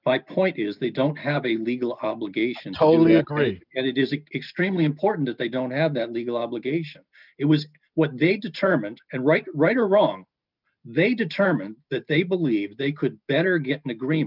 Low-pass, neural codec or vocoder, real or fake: 5.4 kHz; none; real